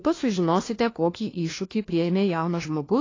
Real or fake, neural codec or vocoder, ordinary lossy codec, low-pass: fake; codec, 16 kHz, 1 kbps, FunCodec, trained on LibriTTS, 50 frames a second; AAC, 32 kbps; 7.2 kHz